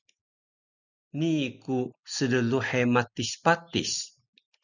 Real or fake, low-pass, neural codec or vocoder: real; 7.2 kHz; none